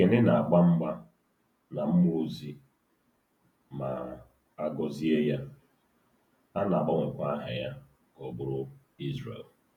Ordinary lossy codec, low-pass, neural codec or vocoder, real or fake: none; 19.8 kHz; vocoder, 48 kHz, 128 mel bands, Vocos; fake